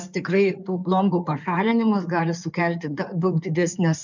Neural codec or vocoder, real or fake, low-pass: codec, 16 kHz in and 24 kHz out, 2.2 kbps, FireRedTTS-2 codec; fake; 7.2 kHz